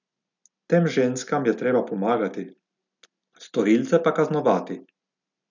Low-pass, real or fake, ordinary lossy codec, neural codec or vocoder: 7.2 kHz; real; none; none